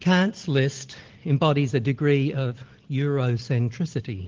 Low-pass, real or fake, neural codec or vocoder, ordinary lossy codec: 7.2 kHz; real; none; Opus, 16 kbps